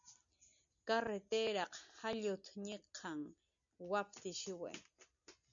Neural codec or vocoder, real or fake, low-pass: none; real; 7.2 kHz